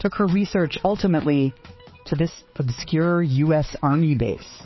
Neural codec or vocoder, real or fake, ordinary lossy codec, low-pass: codec, 16 kHz, 4 kbps, X-Codec, HuBERT features, trained on balanced general audio; fake; MP3, 24 kbps; 7.2 kHz